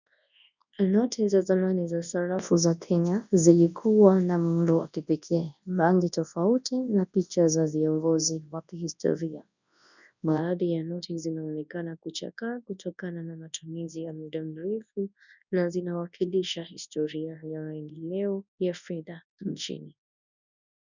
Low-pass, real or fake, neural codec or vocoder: 7.2 kHz; fake; codec, 24 kHz, 0.9 kbps, WavTokenizer, large speech release